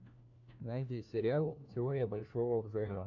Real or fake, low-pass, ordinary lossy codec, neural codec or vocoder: fake; 7.2 kHz; AAC, 48 kbps; codec, 16 kHz, 1 kbps, FunCodec, trained on LibriTTS, 50 frames a second